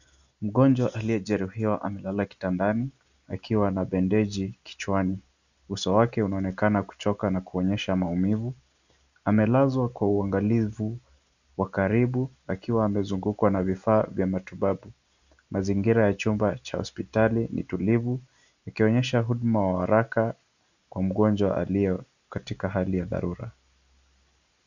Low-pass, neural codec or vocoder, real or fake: 7.2 kHz; none; real